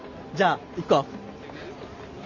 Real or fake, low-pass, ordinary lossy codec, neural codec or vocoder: real; 7.2 kHz; none; none